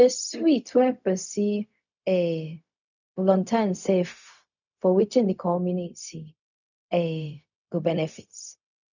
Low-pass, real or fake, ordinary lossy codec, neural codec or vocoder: 7.2 kHz; fake; none; codec, 16 kHz, 0.4 kbps, LongCat-Audio-Codec